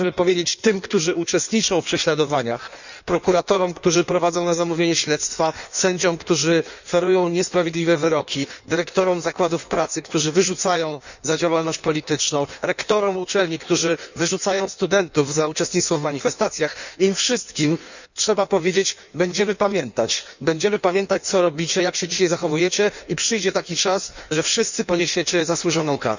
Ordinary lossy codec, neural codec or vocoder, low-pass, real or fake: none; codec, 16 kHz in and 24 kHz out, 1.1 kbps, FireRedTTS-2 codec; 7.2 kHz; fake